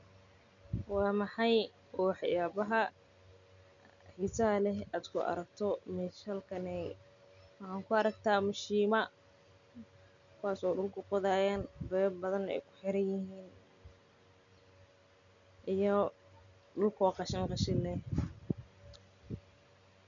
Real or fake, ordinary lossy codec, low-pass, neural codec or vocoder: real; none; 7.2 kHz; none